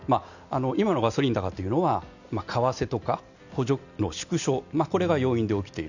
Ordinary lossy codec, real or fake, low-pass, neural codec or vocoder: none; real; 7.2 kHz; none